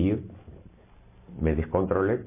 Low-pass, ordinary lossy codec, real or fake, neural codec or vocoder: 3.6 kHz; MP3, 24 kbps; real; none